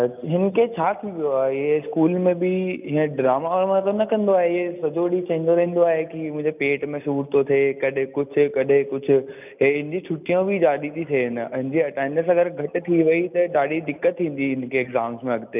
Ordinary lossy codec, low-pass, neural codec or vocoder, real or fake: none; 3.6 kHz; none; real